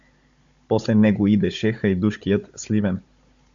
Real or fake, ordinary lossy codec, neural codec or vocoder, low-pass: fake; AAC, 64 kbps; codec, 16 kHz, 16 kbps, FunCodec, trained on Chinese and English, 50 frames a second; 7.2 kHz